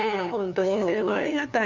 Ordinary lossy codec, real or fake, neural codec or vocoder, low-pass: none; fake; codec, 16 kHz, 2 kbps, FunCodec, trained on LibriTTS, 25 frames a second; 7.2 kHz